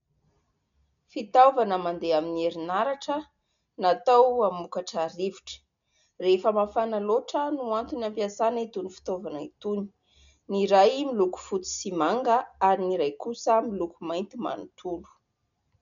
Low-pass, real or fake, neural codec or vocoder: 7.2 kHz; real; none